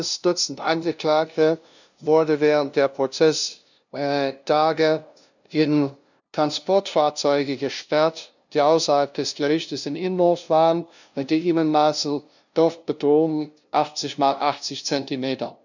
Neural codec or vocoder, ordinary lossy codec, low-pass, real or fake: codec, 16 kHz, 0.5 kbps, FunCodec, trained on LibriTTS, 25 frames a second; none; 7.2 kHz; fake